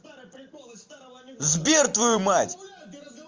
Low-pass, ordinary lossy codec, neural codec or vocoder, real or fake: 7.2 kHz; Opus, 32 kbps; none; real